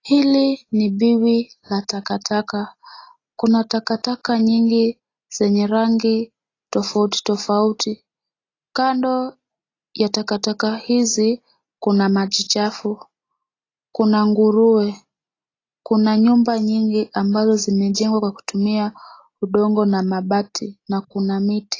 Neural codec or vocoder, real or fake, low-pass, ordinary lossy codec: none; real; 7.2 kHz; AAC, 32 kbps